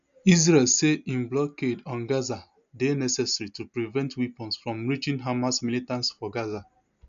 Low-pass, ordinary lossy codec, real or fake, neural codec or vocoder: 7.2 kHz; AAC, 96 kbps; real; none